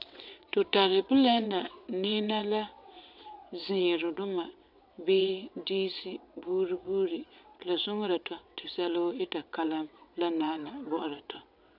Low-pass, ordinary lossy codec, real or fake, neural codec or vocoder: 5.4 kHz; MP3, 48 kbps; fake; vocoder, 22.05 kHz, 80 mel bands, WaveNeXt